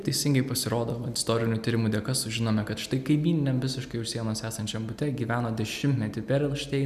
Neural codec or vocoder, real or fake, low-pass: none; real; 14.4 kHz